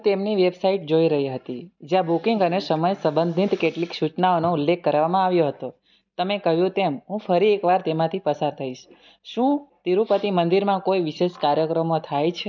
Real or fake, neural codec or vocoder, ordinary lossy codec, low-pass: real; none; none; 7.2 kHz